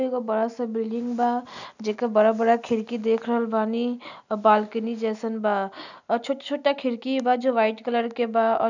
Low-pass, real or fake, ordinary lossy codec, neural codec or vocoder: 7.2 kHz; real; none; none